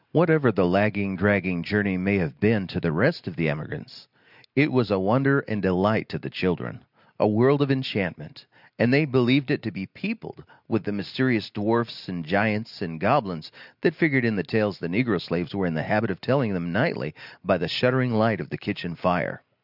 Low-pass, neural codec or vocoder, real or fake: 5.4 kHz; none; real